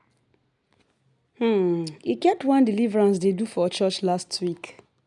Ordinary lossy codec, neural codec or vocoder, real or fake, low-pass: none; none; real; 10.8 kHz